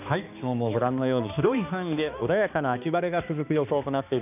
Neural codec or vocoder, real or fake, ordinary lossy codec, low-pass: codec, 16 kHz, 2 kbps, X-Codec, HuBERT features, trained on balanced general audio; fake; none; 3.6 kHz